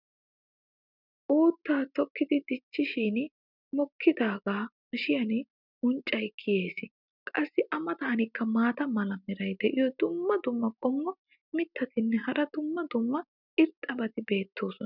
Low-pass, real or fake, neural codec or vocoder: 5.4 kHz; real; none